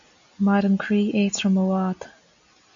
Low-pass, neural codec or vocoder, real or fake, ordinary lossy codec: 7.2 kHz; none; real; MP3, 64 kbps